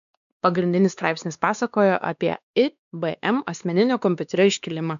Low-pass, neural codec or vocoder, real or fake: 7.2 kHz; codec, 16 kHz, 2 kbps, X-Codec, WavLM features, trained on Multilingual LibriSpeech; fake